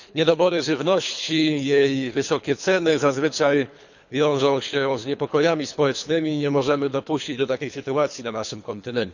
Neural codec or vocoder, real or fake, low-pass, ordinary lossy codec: codec, 24 kHz, 3 kbps, HILCodec; fake; 7.2 kHz; none